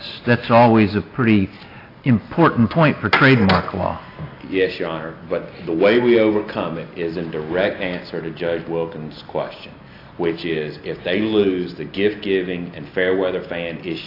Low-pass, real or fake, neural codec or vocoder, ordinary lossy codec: 5.4 kHz; real; none; AAC, 32 kbps